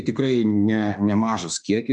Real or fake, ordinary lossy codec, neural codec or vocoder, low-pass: fake; MP3, 96 kbps; autoencoder, 48 kHz, 32 numbers a frame, DAC-VAE, trained on Japanese speech; 10.8 kHz